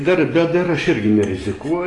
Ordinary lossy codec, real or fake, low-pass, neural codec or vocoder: AAC, 32 kbps; real; 10.8 kHz; none